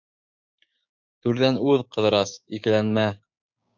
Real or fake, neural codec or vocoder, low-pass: fake; codec, 16 kHz, 6 kbps, DAC; 7.2 kHz